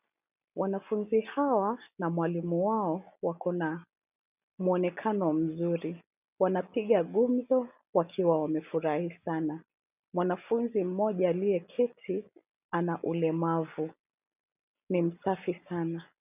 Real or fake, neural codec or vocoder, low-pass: real; none; 3.6 kHz